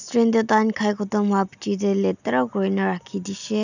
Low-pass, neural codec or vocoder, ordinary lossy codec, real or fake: 7.2 kHz; none; none; real